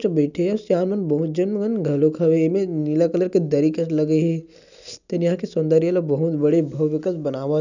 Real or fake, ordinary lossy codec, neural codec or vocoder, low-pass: real; none; none; 7.2 kHz